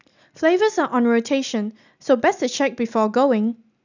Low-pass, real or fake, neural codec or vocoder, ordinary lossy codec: 7.2 kHz; real; none; none